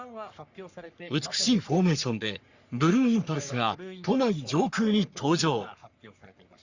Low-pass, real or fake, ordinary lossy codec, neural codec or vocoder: 7.2 kHz; fake; Opus, 64 kbps; codec, 44.1 kHz, 3.4 kbps, Pupu-Codec